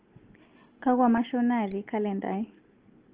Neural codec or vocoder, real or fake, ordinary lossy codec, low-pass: none; real; Opus, 16 kbps; 3.6 kHz